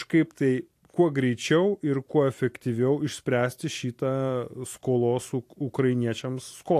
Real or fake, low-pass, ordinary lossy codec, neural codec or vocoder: fake; 14.4 kHz; AAC, 64 kbps; vocoder, 44.1 kHz, 128 mel bands every 256 samples, BigVGAN v2